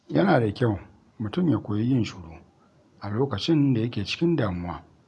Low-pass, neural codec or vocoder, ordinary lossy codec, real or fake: none; none; none; real